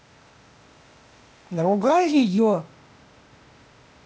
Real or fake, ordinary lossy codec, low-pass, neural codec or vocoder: fake; none; none; codec, 16 kHz, 0.8 kbps, ZipCodec